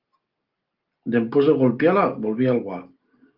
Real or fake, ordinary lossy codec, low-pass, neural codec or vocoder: real; Opus, 32 kbps; 5.4 kHz; none